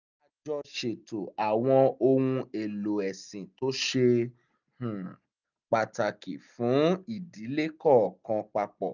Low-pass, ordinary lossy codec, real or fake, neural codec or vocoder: 7.2 kHz; none; real; none